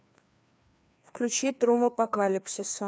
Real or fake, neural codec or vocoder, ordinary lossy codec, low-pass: fake; codec, 16 kHz, 2 kbps, FreqCodec, larger model; none; none